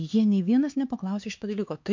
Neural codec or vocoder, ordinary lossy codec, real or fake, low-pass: codec, 16 kHz, 4 kbps, X-Codec, HuBERT features, trained on LibriSpeech; MP3, 48 kbps; fake; 7.2 kHz